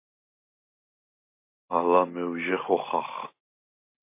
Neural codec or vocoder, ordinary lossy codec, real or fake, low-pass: none; MP3, 24 kbps; real; 3.6 kHz